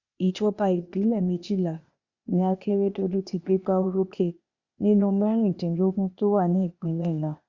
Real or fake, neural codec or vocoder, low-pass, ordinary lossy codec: fake; codec, 16 kHz, 0.8 kbps, ZipCodec; 7.2 kHz; Opus, 64 kbps